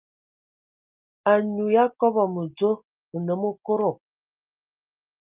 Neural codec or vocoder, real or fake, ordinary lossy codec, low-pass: none; real; Opus, 32 kbps; 3.6 kHz